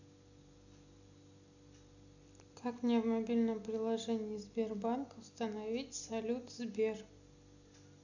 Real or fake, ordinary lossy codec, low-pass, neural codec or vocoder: real; none; 7.2 kHz; none